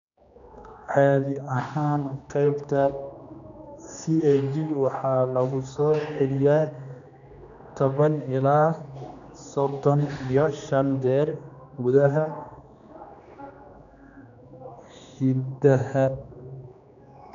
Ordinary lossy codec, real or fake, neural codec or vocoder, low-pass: none; fake; codec, 16 kHz, 2 kbps, X-Codec, HuBERT features, trained on general audio; 7.2 kHz